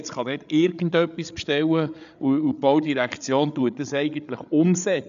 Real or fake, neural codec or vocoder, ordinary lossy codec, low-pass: fake; codec, 16 kHz, 8 kbps, FreqCodec, larger model; none; 7.2 kHz